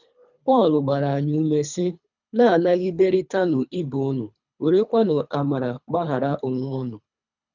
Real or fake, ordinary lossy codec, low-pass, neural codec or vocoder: fake; none; 7.2 kHz; codec, 24 kHz, 3 kbps, HILCodec